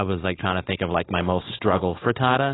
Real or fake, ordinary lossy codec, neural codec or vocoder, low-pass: fake; AAC, 16 kbps; codec, 16 kHz, 4.8 kbps, FACodec; 7.2 kHz